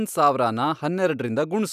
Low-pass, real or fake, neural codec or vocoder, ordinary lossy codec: 14.4 kHz; real; none; none